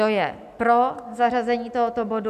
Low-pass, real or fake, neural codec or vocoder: 14.4 kHz; real; none